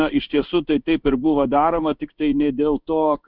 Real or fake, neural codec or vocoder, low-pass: fake; codec, 16 kHz in and 24 kHz out, 1 kbps, XY-Tokenizer; 5.4 kHz